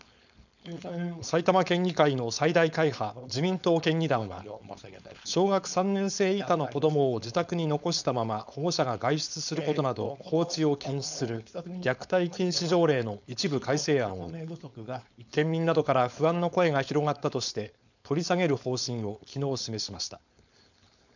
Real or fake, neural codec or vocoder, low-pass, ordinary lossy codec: fake; codec, 16 kHz, 4.8 kbps, FACodec; 7.2 kHz; none